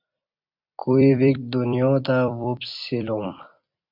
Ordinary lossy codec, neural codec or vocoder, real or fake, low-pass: MP3, 48 kbps; vocoder, 44.1 kHz, 128 mel bands every 256 samples, BigVGAN v2; fake; 5.4 kHz